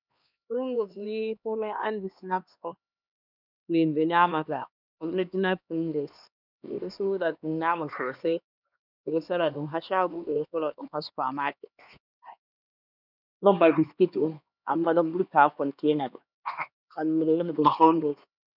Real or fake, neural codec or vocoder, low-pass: fake; codec, 16 kHz, 2 kbps, X-Codec, HuBERT features, trained on LibriSpeech; 5.4 kHz